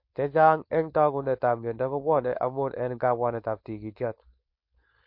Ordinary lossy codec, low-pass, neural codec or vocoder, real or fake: MP3, 32 kbps; 5.4 kHz; codec, 16 kHz, 4.8 kbps, FACodec; fake